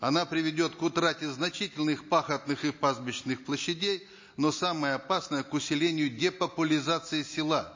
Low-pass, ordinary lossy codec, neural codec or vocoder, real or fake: 7.2 kHz; MP3, 32 kbps; none; real